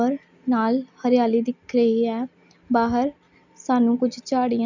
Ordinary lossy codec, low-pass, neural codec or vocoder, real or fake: none; 7.2 kHz; none; real